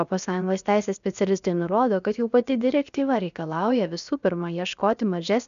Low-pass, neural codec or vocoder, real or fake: 7.2 kHz; codec, 16 kHz, 0.7 kbps, FocalCodec; fake